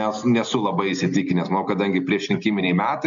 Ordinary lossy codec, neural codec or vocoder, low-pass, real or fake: MP3, 64 kbps; none; 7.2 kHz; real